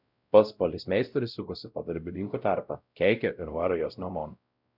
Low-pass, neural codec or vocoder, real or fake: 5.4 kHz; codec, 16 kHz, 0.5 kbps, X-Codec, WavLM features, trained on Multilingual LibriSpeech; fake